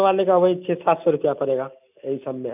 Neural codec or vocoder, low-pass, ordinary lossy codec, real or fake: none; 3.6 kHz; none; real